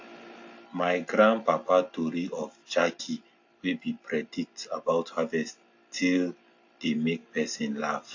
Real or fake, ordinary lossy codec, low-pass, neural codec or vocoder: real; AAC, 48 kbps; 7.2 kHz; none